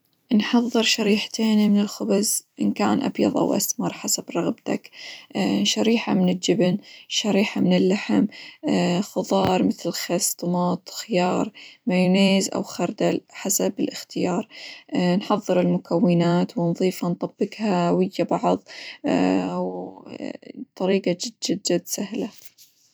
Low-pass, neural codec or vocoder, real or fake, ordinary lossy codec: none; vocoder, 44.1 kHz, 128 mel bands every 256 samples, BigVGAN v2; fake; none